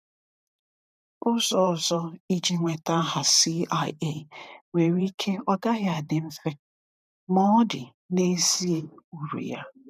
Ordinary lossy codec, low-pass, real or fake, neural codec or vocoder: none; 14.4 kHz; fake; vocoder, 44.1 kHz, 128 mel bands every 512 samples, BigVGAN v2